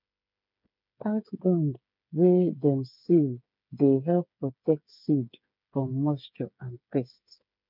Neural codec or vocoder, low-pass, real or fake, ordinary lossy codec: codec, 16 kHz, 8 kbps, FreqCodec, smaller model; 5.4 kHz; fake; none